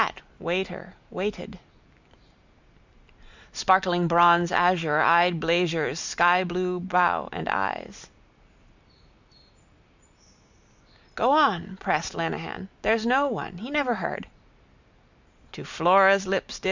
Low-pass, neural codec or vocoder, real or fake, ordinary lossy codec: 7.2 kHz; none; real; Opus, 64 kbps